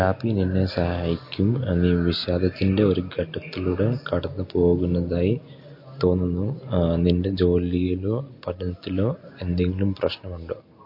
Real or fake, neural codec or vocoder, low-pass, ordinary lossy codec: real; none; 5.4 kHz; MP3, 32 kbps